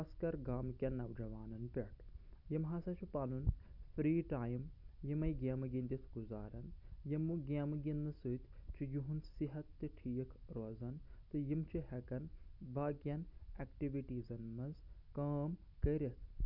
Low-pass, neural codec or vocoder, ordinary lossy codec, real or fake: 5.4 kHz; none; none; real